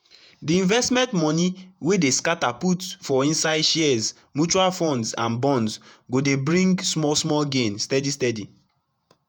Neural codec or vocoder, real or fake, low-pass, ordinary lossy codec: vocoder, 48 kHz, 128 mel bands, Vocos; fake; none; none